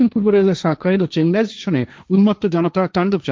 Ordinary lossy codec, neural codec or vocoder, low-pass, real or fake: none; codec, 16 kHz, 1.1 kbps, Voila-Tokenizer; none; fake